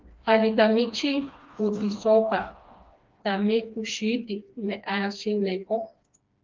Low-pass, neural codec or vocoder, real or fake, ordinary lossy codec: 7.2 kHz; codec, 16 kHz, 2 kbps, FreqCodec, smaller model; fake; Opus, 24 kbps